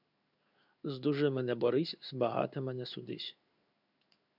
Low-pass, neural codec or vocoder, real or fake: 5.4 kHz; codec, 16 kHz in and 24 kHz out, 1 kbps, XY-Tokenizer; fake